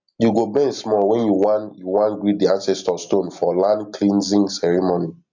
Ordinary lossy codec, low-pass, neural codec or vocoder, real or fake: MP3, 64 kbps; 7.2 kHz; none; real